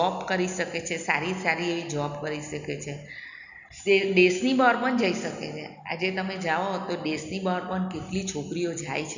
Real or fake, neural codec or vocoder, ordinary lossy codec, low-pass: real; none; none; 7.2 kHz